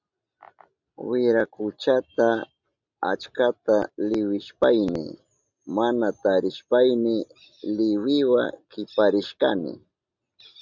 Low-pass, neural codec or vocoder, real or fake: 7.2 kHz; none; real